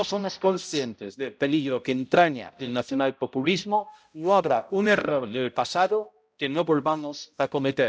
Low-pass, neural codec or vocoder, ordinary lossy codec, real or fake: none; codec, 16 kHz, 0.5 kbps, X-Codec, HuBERT features, trained on balanced general audio; none; fake